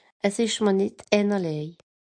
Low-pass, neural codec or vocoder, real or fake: 9.9 kHz; none; real